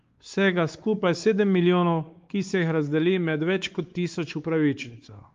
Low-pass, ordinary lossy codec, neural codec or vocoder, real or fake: 7.2 kHz; Opus, 32 kbps; codec, 16 kHz, 4 kbps, X-Codec, WavLM features, trained on Multilingual LibriSpeech; fake